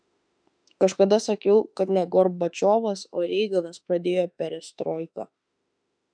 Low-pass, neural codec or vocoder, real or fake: 9.9 kHz; autoencoder, 48 kHz, 32 numbers a frame, DAC-VAE, trained on Japanese speech; fake